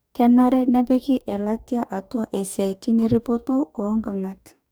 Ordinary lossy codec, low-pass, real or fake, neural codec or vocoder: none; none; fake; codec, 44.1 kHz, 2.6 kbps, DAC